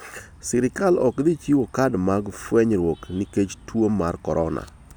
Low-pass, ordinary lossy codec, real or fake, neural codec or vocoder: none; none; real; none